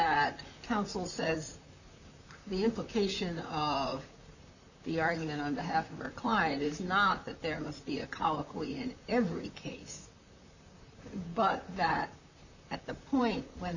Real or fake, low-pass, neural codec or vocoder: fake; 7.2 kHz; vocoder, 44.1 kHz, 128 mel bands, Pupu-Vocoder